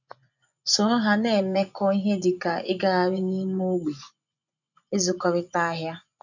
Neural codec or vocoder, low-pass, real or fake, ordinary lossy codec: vocoder, 24 kHz, 100 mel bands, Vocos; 7.2 kHz; fake; none